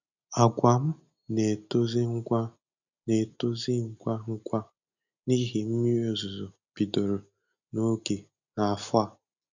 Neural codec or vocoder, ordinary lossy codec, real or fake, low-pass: none; none; real; 7.2 kHz